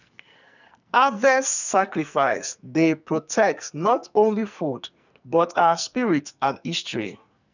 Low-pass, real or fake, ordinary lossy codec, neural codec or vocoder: 7.2 kHz; fake; none; codec, 44.1 kHz, 2.6 kbps, SNAC